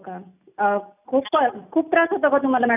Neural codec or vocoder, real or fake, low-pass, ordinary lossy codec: none; real; 3.6 kHz; none